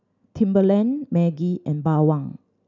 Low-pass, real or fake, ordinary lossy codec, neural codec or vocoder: 7.2 kHz; real; none; none